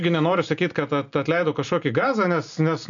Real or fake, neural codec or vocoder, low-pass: real; none; 7.2 kHz